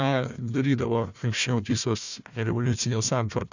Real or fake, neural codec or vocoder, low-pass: fake; codec, 16 kHz, 1 kbps, FunCodec, trained on Chinese and English, 50 frames a second; 7.2 kHz